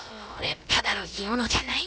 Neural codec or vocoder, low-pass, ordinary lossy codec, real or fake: codec, 16 kHz, about 1 kbps, DyCAST, with the encoder's durations; none; none; fake